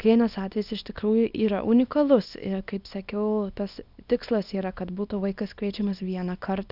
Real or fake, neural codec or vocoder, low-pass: fake; codec, 24 kHz, 0.9 kbps, WavTokenizer, small release; 5.4 kHz